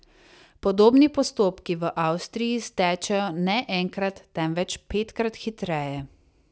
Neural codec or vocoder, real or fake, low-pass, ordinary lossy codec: none; real; none; none